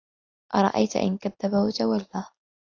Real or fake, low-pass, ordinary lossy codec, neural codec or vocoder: real; 7.2 kHz; AAC, 48 kbps; none